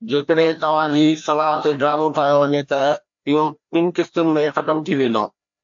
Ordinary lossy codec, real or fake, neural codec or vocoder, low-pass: AAC, 64 kbps; fake; codec, 16 kHz, 1 kbps, FreqCodec, larger model; 7.2 kHz